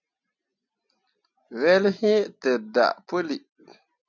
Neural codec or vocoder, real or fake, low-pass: none; real; 7.2 kHz